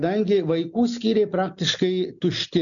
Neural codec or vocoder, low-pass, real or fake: none; 7.2 kHz; real